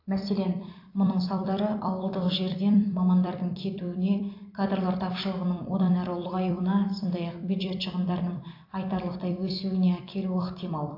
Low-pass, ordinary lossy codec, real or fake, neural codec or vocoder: 5.4 kHz; AAC, 32 kbps; fake; vocoder, 44.1 kHz, 128 mel bands every 256 samples, BigVGAN v2